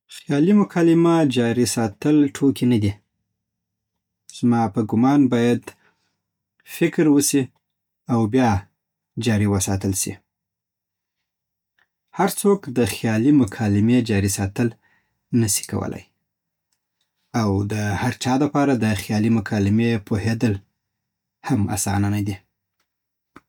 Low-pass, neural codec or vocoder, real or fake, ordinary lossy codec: 19.8 kHz; none; real; none